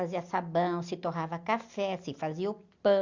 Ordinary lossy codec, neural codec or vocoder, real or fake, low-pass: Opus, 64 kbps; none; real; 7.2 kHz